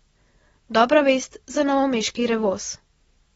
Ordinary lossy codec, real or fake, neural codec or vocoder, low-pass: AAC, 24 kbps; real; none; 19.8 kHz